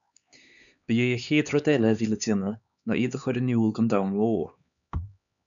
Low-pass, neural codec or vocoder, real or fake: 7.2 kHz; codec, 16 kHz, 4 kbps, X-Codec, HuBERT features, trained on LibriSpeech; fake